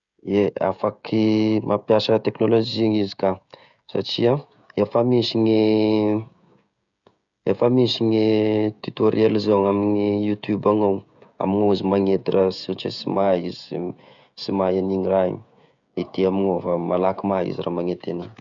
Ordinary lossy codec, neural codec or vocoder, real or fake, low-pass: none; codec, 16 kHz, 16 kbps, FreqCodec, smaller model; fake; 7.2 kHz